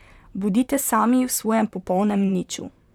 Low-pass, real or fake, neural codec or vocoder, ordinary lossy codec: 19.8 kHz; fake; vocoder, 44.1 kHz, 128 mel bands, Pupu-Vocoder; none